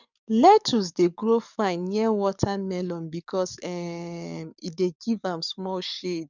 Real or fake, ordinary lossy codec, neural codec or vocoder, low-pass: fake; none; codec, 44.1 kHz, 7.8 kbps, DAC; 7.2 kHz